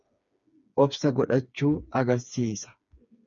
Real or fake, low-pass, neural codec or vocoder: fake; 7.2 kHz; codec, 16 kHz, 4 kbps, FreqCodec, smaller model